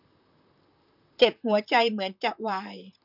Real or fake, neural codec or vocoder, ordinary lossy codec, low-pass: fake; vocoder, 44.1 kHz, 128 mel bands, Pupu-Vocoder; none; 5.4 kHz